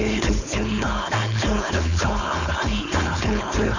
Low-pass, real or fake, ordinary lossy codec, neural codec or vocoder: 7.2 kHz; fake; none; codec, 16 kHz, 4.8 kbps, FACodec